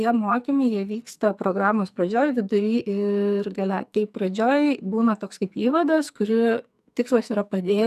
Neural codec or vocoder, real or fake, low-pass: codec, 44.1 kHz, 2.6 kbps, SNAC; fake; 14.4 kHz